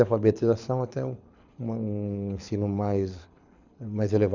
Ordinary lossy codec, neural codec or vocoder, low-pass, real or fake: none; codec, 24 kHz, 6 kbps, HILCodec; 7.2 kHz; fake